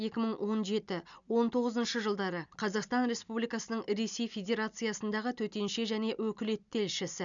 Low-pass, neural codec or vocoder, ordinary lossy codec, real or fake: 7.2 kHz; none; none; real